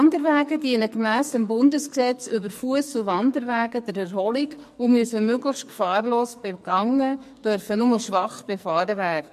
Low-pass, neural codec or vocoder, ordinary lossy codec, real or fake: 14.4 kHz; codec, 44.1 kHz, 2.6 kbps, SNAC; MP3, 64 kbps; fake